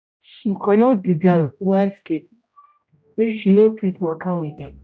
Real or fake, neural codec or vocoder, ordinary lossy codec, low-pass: fake; codec, 16 kHz, 0.5 kbps, X-Codec, HuBERT features, trained on general audio; none; none